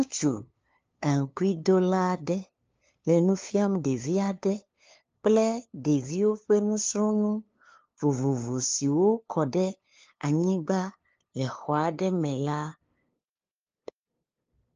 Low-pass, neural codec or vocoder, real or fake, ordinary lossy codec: 7.2 kHz; codec, 16 kHz, 2 kbps, FunCodec, trained on LibriTTS, 25 frames a second; fake; Opus, 24 kbps